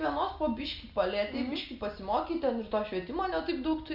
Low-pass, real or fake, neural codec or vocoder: 5.4 kHz; real; none